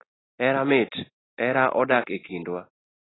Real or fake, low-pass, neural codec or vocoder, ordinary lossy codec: real; 7.2 kHz; none; AAC, 16 kbps